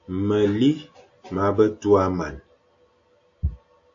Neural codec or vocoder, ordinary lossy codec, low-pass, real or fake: none; AAC, 48 kbps; 7.2 kHz; real